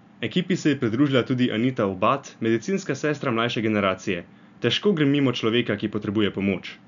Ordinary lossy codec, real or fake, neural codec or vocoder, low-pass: none; real; none; 7.2 kHz